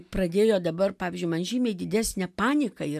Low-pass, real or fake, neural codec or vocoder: 14.4 kHz; real; none